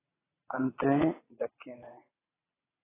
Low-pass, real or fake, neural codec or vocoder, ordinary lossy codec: 3.6 kHz; fake; vocoder, 22.05 kHz, 80 mel bands, WaveNeXt; AAC, 16 kbps